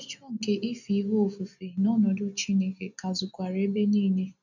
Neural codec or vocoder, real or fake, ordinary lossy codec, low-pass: none; real; none; 7.2 kHz